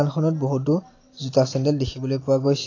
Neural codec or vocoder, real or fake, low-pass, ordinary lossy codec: none; real; 7.2 kHz; AAC, 32 kbps